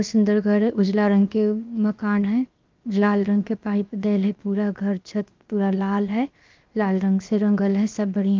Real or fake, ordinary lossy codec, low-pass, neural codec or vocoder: fake; Opus, 24 kbps; 7.2 kHz; codec, 16 kHz, 0.7 kbps, FocalCodec